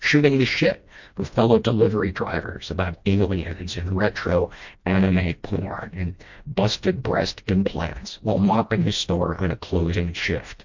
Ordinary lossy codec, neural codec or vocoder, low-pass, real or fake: MP3, 48 kbps; codec, 16 kHz, 1 kbps, FreqCodec, smaller model; 7.2 kHz; fake